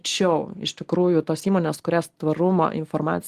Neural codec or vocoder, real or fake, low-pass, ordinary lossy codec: none; real; 14.4 kHz; Opus, 24 kbps